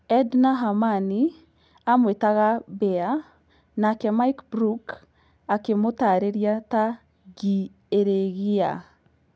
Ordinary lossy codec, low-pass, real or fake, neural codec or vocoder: none; none; real; none